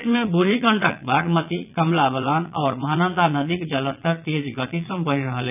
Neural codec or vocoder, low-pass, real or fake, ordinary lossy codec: vocoder, 22.05 kHz, 80 mel bands, Vocos; 3.6 kHz; fake; none